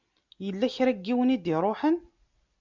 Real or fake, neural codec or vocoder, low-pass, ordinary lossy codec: real; none; 7.2 kHz; MP3, 64 kbps